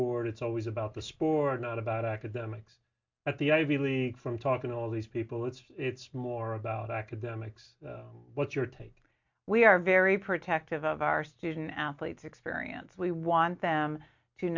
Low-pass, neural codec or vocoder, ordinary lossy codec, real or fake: 7.2 kHz; none; MP3, 64 kbps; real